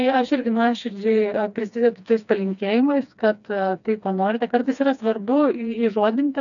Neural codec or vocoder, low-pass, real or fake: codec, 16 kHz, 2 kbps, FreqCodec, smaller model; 7.2 kHz; fake